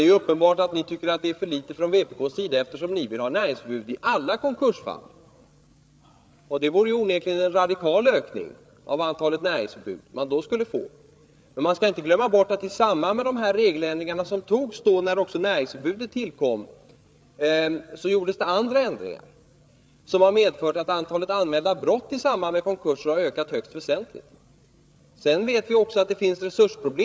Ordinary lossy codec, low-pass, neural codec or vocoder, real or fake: none; none; codec, 16 kHz, 8 kbps, FreqCodec, larger model; fake